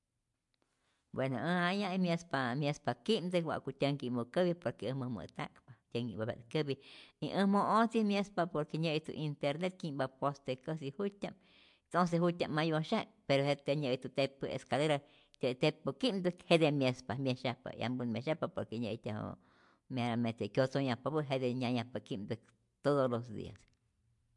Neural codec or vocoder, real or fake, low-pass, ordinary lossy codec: none; real; 10.8 kHz; MP3, 64 kbps